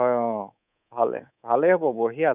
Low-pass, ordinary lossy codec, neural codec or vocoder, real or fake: 3.6 kHz; none; codec, 24 kHz, 3.1 kbps, DualCodec; fake